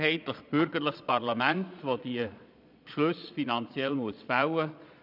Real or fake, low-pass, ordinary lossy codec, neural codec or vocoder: fake; 5.4 kHz; none; vocoder, 22.05 kHz, 80 mel bands, Vocos